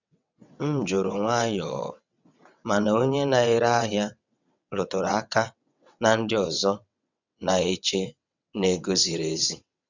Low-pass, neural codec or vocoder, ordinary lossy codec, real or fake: 7.2 kHz; vocoder, 22.05 kHz, 80 mel bands, WaveNeXt; none; fake